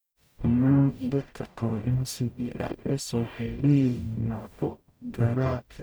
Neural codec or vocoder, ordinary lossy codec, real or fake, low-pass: codec, 44.1 kHz, 0.9 kbps, DAC; none; fake; none